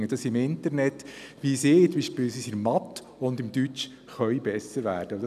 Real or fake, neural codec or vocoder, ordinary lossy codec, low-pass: real; none; none; 14.4 kHz